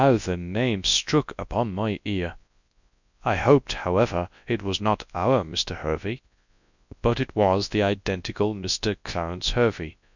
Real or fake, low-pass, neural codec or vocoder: fake; 7.2 kHz; codec, 24 kHz, 0.9 kbps, WavTokenizer, large speech release